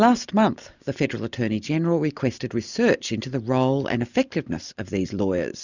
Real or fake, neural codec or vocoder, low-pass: real; none; 7.2 kHz